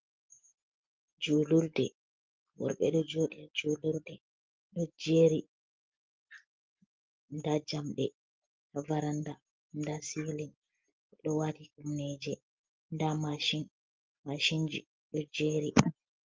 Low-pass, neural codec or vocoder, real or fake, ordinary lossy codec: 7.2 kHz; none; real; Opus, 32 kbps